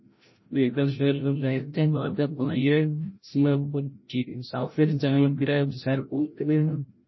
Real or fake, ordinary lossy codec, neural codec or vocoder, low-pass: fake; MP3, 24 kbps; codec, 16 kHz, 0.5 kbps, FreqCodec, larger model; 7.2 kHz